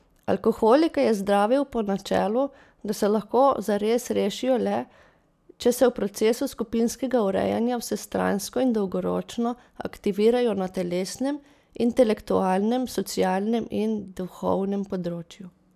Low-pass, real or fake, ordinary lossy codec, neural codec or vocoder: 14.4 kHz; real; none; none